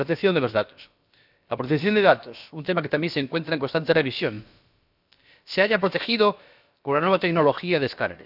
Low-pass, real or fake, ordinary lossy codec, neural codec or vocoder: 5.4 kHz; fake; none; codec, 16 kHz, about 1 kbps, DyCAST, with the encoder's durations